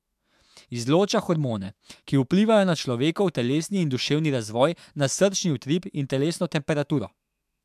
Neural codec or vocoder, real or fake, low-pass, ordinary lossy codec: autoencoder, 48 kHz, 128 numbers a frame, DAC-VAE, trained on Japanese speech; fake; 14.4 kHz; MP3, 96 kbps